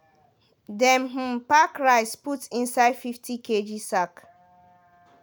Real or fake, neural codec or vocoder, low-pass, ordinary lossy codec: real; none; none; none